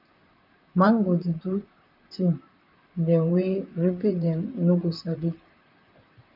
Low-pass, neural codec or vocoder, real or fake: 5.4 kHz; vocoder, 44.1 kHz, 128 mel bands, Pupu-Vocoder; fake